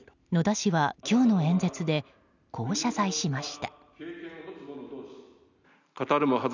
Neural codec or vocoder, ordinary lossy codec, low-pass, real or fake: none; none; 7.2 kHz; real